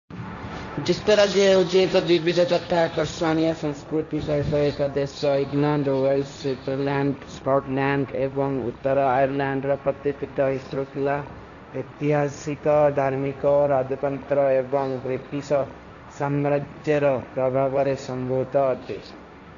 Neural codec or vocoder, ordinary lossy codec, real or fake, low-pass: codec, 16 kHz, 1.1 kbps, Voila-Tokenizer; none; fake; 7.2 kHz